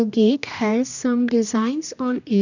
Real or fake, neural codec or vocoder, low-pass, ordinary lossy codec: fake; codec, 16 kHz, 1 kbps, X-Codec, HuBERT features, trained on general audio; 7.2 kHz; none